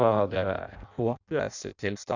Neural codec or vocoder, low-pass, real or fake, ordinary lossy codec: codec, 16 kHz in and 24 kHz out, 0.6 kbps, FireRedTTS-2 codec; 7.2 kHz; fake; none